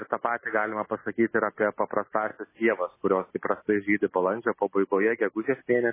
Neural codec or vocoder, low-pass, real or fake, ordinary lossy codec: none; 3.6 kHz; real; MP3, 16 kbps